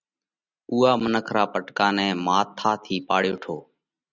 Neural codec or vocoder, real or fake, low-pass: none; real; 7.2 kHz